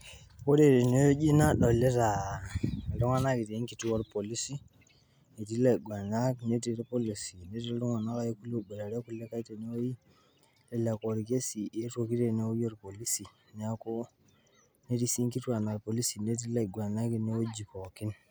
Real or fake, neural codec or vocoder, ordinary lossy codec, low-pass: real; none; none; none